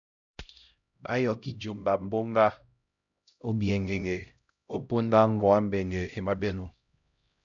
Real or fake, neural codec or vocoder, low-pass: fake; codec, 16 kHz, 0.5 kbps, X-Codec, HuBERT features, trained on LibriSpeech; 7.2 kHz